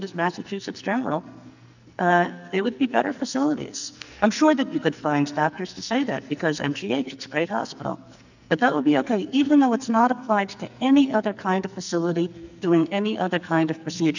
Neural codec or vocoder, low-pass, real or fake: codec, 44.1 kHz, 2.6 kbps, SNAC; 7.2 kHz; fake